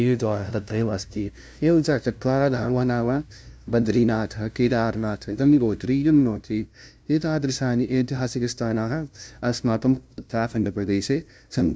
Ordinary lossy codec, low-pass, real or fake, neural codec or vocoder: none; none; fake; codec, 16 kHz, 0.5 kbps, FunCodec, trained on LibriTTS, 25 frames a second